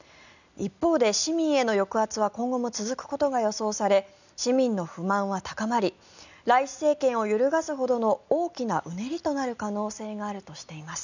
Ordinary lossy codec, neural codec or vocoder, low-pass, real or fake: none; none; 7.2 kHz; real